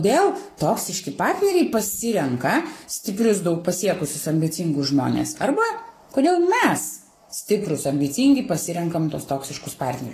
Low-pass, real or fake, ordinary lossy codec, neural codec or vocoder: 14.4 kHz; fake; AAC, 48 kbps; codec, 44.1 kHz, 7.8 kbps, Pupu-Codec